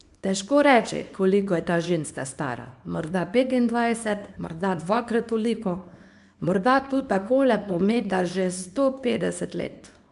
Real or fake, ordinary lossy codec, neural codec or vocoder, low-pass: fake; none; codec, 24 kHz, 0.9 kbps, WavTokenizer, small release; 10.8 kHz